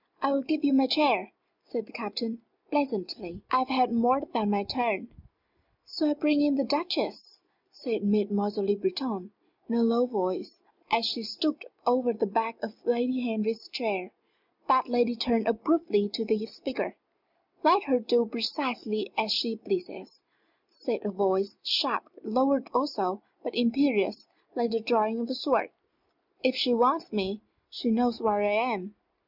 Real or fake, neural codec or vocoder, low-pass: real; none; 5.4 kHz